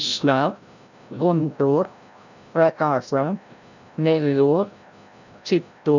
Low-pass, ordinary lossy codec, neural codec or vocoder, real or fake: 7.2 kHz; none; codec, 16 kHz, 0.5 kbps, FreqCodec, larger model; fake